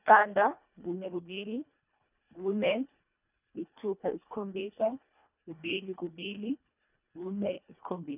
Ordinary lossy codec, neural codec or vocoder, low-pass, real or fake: none; codec, 24 kHz, 1.5 kbps, HILCodec; 3.6 kHz; fake